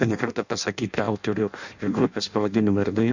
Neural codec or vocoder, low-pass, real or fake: codec, 16 kHz in and 24 kHz out, 0.6 kbps, FireRedTTS-2 codec; 7.2 kHz; fake